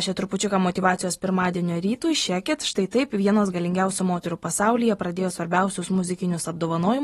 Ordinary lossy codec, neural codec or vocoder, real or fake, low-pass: AAC, 32 kbps; none; real; 19.8 kHz